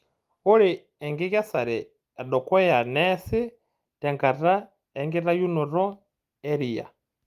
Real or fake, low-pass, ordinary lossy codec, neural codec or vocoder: real; 14.4 kHz; Opus, 32 kbps; none